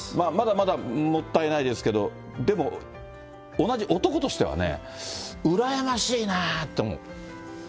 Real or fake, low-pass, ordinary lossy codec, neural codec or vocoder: real; none; none; none